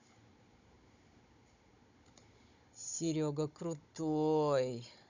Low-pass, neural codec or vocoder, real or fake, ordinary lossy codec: 7.2 kHz; codec, 16 kHz, 16 kbps, FunCodec, trained on Chinese and English, 50 frames a second; fake; none